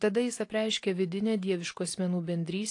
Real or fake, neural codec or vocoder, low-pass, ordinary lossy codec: real; none; 10.8 kHz; AAC, 48 kbps